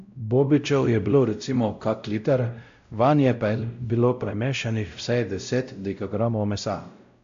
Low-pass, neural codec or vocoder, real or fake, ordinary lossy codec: 7.2 kHz; codec, 16 kHz, 0.5 kbps, X-Codec, WavLM features, trained on Multilingual LibriSpeech; fake; AAC, 64 kbps